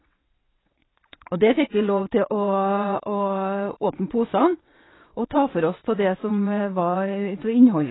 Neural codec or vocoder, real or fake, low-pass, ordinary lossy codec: vocoder, 22.05 kHz, 80 mel bands, WaveNeXt; fake; 7.2 kHz; AAC, 16 kbps